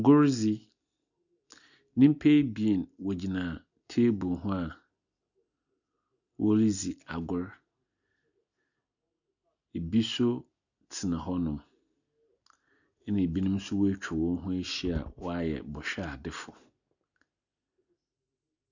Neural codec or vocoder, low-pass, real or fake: none; 7.2 kHz; real